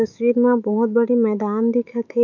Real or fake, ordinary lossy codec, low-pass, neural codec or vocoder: fake; MP3, 64 kbps; 7.2 kHz; autoencoder, 48 kHz, 128 numbers a frame, DAC-VAE, trained on Japanese speech